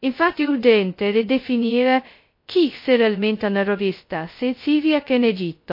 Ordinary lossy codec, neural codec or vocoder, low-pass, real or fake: MP3, 32 kbps; codec, 16 kHz, 0.2 kbps, FocalCodec; 5.4 kHz; fake